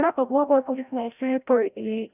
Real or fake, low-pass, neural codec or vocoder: fake; 3.6 kHz; codec, 16 kHz, 0.5 kbps, FreqCodec, larger model